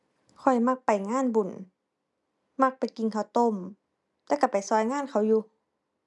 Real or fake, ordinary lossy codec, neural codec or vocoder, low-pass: real; none; none; 10.8 kHz